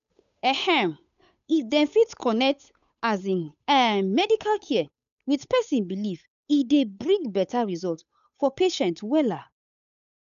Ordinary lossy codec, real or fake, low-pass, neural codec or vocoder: none; fake; 7.2 kHz; codec, 16 kHz, 8 kbps, FunCodec, trained on Chinese and English, 25 frames a second